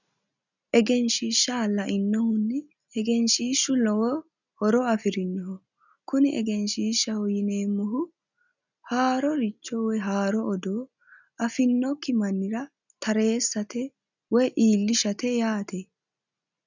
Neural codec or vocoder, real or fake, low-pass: none; real; 7.2 kHz